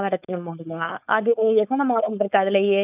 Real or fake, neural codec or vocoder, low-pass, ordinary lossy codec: fake; codec, 16 kHz, 4.8 kbps, FACodec; 3.6 kHz; none